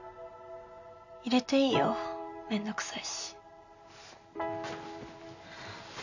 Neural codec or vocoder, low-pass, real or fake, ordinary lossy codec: none; 7.2 kHz; real; none